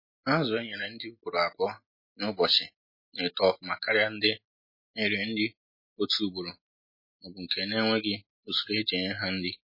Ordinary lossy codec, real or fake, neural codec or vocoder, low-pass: MP3, 24 kbps; real; none; 5.4 kHz